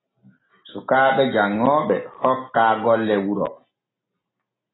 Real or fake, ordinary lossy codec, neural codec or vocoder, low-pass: real; AAC, 16 kbps; none; 7.2 kHz